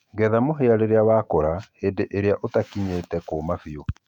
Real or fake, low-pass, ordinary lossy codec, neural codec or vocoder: fake; 19.8 kHz; none; autoencoder, 48 kHz, 128 numbers a frame, DAC-VAE, trained on Japanese speech